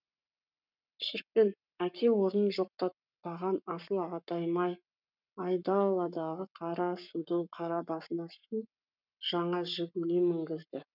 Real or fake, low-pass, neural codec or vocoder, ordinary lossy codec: fake; 5.4 kHz; codec, 44.1 kHz, 7.8 kbps, Pupu-Codec; none